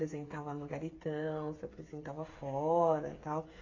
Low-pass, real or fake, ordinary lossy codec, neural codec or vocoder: 7.2 kHz; fake; AAC, 32 kbps; codec, 16 kHz, 8 kbps, FreqCodec, smaller model